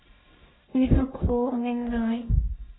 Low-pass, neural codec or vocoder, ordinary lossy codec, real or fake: 7.2 kHz; codec, 44.1 kHz, 1.7 kbps, Pupu-Codec; AAC, 16 kbps; fake